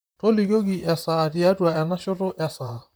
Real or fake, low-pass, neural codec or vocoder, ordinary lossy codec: fake; none; vocoder, 44.1 kHz, 128 mel bands, Pupu-Vocoder; none